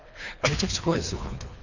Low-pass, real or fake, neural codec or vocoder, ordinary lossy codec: 7.2 kHz; fake; codec, 24 kHz, 3 kbps, HILCodec; none